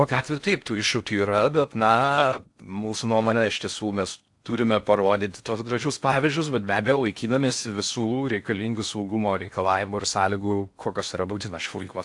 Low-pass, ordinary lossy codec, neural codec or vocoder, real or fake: 10.8 kHz; AAC, 64 kbps; codec, 16 kHz in and 24 kHz out, 0.6 kbps, FocalCodec, streaming, 4096 codes; fake